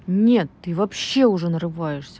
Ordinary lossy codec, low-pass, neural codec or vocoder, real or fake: none; none; none; real